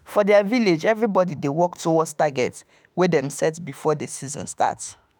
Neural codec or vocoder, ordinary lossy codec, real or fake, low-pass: autoencoder, 48 kHz, 32 numbers a frame, DAC-VAE, trained on Japanese speech; none; fake; none